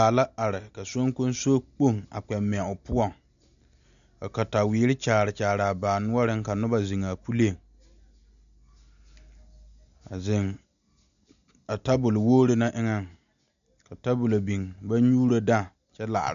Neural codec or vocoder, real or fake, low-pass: none; real; 7.2 kHz